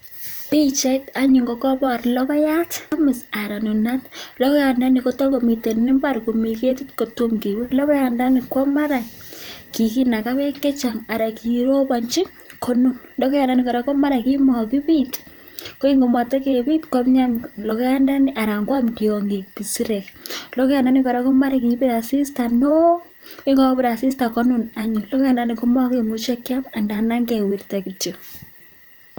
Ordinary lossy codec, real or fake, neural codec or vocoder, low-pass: none; fake; vocoder, 44.1 kHz, 128 mel bands, Pupu-Vocoder; none